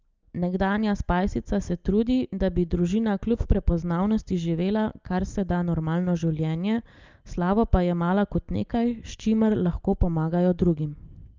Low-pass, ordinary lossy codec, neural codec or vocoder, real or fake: 7.2 kHz; Opus, 24 kbps; none; real